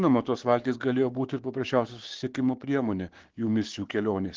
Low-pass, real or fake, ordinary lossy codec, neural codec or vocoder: 7.2 kHz; fake; Opus, 16 kbps; codec, 16 kHz, 6 kbps, DAC